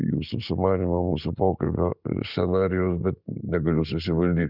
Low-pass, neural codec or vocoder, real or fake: 5.4 kHz; none; real